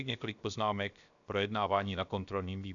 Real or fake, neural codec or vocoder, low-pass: fake; codec, 16 kHz, 0.7 kbps, FocalCodec; 7.2 kHz